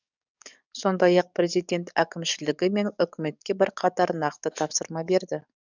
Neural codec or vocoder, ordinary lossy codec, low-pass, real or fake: codec, 44.1 kHz, 7.8 kbps, DAC; none; 7.2 kHz; fake